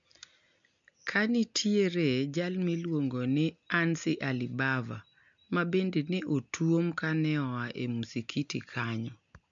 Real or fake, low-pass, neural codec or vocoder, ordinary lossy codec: real; 7.2 kHz; none; MP3, 64 kbps